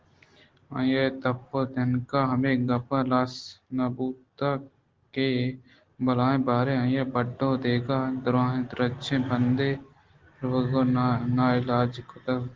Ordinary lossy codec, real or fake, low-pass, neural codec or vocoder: Opus, 16 kbps; real; 7.2 kHz; none